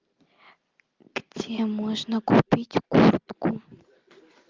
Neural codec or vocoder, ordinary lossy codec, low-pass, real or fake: none; Opus, 24 kbps; 7.2 kHz; real